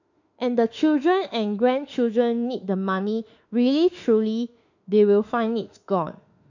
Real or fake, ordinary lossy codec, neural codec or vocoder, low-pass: fake; none; autoencoder, 48 kHz, 32 numbers a frame, DAC-VAE, trained on Japanese speech; 7.2 kHz